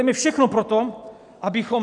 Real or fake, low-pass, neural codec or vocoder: real; 10.8 kHz; none